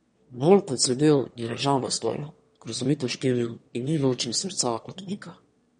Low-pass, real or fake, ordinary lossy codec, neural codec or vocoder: 9.9 kHz; fake; MP3, 48 kbps; autoencoder, 22.05 kHz, a latent of 192 numbers a frame, VITS, trained on one speaker